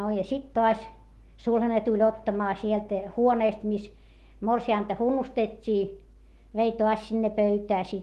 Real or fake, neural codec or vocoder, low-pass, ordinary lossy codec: fake; autoencoder, 48 kHz, 128 numbers a frame, DAC-VAE, trained on Japanese speech; 14.4 kHz; Opus, 16 kbps